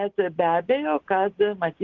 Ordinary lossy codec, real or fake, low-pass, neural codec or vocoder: Opus, 16 kbps; real; 7.2 kHz; none